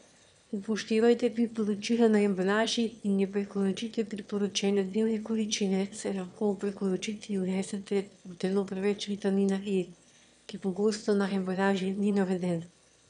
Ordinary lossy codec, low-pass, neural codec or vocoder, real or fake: none; 9.9 kHz; autoencoder, 22.05 kHz, a latent of 192 numbers a frame, VITS, trained on one speaker; fake